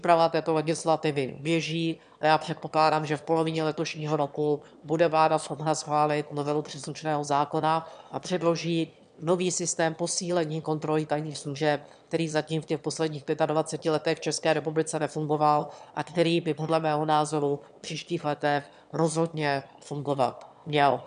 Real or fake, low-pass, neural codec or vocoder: fake; 9.9 kHz; autoencoder, 22.05 kHz, a latent of 192 numbers a frame, VITS, trained on one speaker